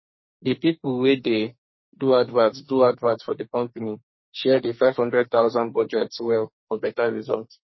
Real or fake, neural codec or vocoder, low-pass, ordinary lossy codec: fake; codec, 44.1 kHz, 2.6 kbps, SNAC; 7.2 kHz; MP3, 24 kbps